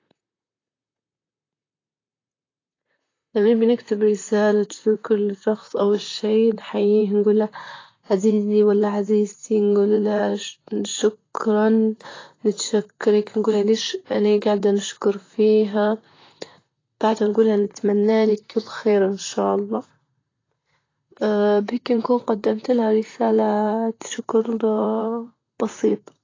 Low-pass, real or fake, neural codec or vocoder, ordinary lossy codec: 7.2 kHz; fake; vocoder, 44.1 kHz, 128 mel bands, Pupu-Vocoder; AAC, 32 kbps